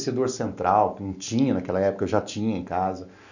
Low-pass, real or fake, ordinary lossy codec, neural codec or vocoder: 7.2 kHz; real; none; none